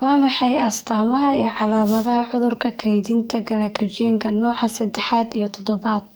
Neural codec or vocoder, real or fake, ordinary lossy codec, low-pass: codec, 44.1 kHz, 2.6 kbps, SNAC; fake; none; none